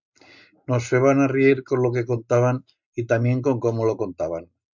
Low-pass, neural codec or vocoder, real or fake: 7.2 kHz; none; real